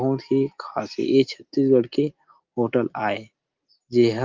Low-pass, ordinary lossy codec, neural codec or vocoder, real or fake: 7.2 kHz; Opus, 32 kbps; none; real